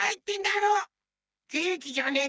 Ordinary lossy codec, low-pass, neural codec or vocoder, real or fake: none; none; codec, 16 kHz, 2 kbps, FreqCodec, smaller model; fake